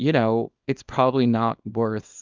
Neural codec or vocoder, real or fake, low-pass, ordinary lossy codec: codec, 24 kHz, 0.9 kbps, WavTokenizer, small release; fake; 7.2 kHz; Opus, 24 kbps